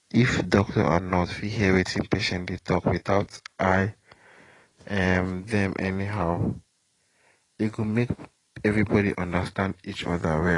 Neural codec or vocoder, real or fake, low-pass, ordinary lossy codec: vocoder, 44.1 kHz, 128 mel bands every 512 samples, BigVGAN v2; fake; 10.8 kHz; AAC, 32 kbps